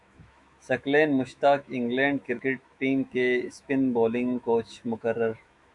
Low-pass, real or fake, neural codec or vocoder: 10.8 kHz; fake; autoencoder, 48 kHz, 128 numbers a frame, DAC-VAE, trained on Japanese speech